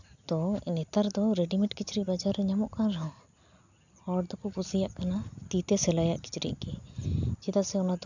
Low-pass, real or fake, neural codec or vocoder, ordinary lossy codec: 7.2 kHz; real; none; none